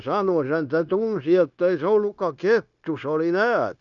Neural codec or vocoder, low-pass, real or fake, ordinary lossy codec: codec, 16 kHz, 0.9 kbps, LongCat-Audio-Codec; 7.2 kHz; fake; none